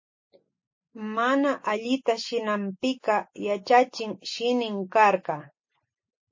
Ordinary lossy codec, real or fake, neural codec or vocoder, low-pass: MP3, 32 kbps; real; none; 7.2 kHz